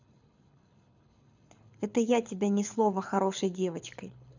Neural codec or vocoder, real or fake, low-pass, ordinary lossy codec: codec, 24 kHz, 6 kbps, HILCodec; fake; 7.2 kHz; none